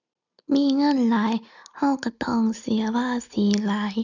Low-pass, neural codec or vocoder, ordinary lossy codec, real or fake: 7.2 kHz; none; none; real